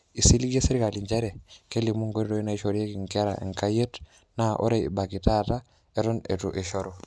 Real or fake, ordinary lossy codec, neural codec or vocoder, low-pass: real; none; none; none